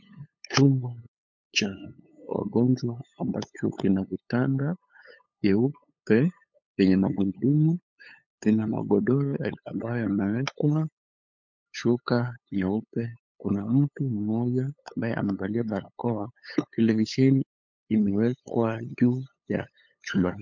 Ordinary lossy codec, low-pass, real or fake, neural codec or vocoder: MP3, 48 kbps; 7.2 kHz; fake; codec, 16 kHz, 8 kbps, FunCodec, trained on LibriTTS, 25 frames a second